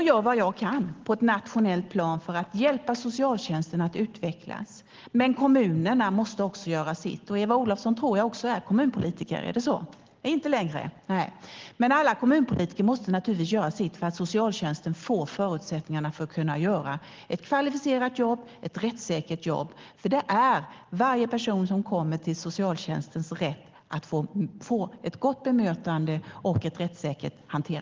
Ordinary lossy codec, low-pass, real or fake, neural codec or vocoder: Opus, 16 kbps; 7.2 kHz; real; none